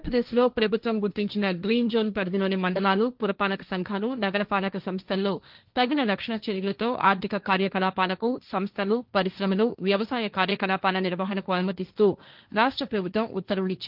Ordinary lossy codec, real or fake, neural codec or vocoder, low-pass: Opus, 32 kbps; fake; codec, 16 kHz, 1.1 kbps, Voila-Tokenizer; 5.4 kHz